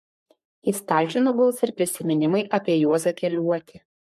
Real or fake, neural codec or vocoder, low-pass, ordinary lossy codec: fake; codec, 44.1 kHz, 3.4 kbps, Pupu-Codec; 14.4 kHz; MP3, 64 kbps